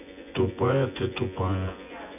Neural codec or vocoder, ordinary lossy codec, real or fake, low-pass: vocoder, 24 kHz, 100 mel bands, Vocos; none; fake; 3.6 kHz